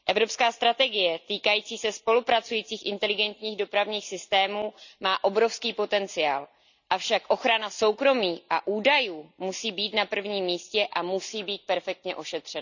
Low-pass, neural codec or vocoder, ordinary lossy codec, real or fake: 7.2 kHz; none; none; real